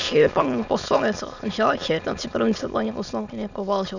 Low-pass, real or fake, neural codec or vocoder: 7.2 kHz; fake; autoencoder, 22.05 kHz, a latent of 192 numbers a frame, VITS, trained on many speakers